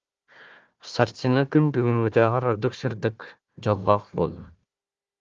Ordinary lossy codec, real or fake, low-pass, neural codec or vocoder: Opus, 32 kbps; fake; 7.2 kHz; codec, 16 kHz, 1 kbps, FunCodec, trained on Chinese and English, 50 frames a second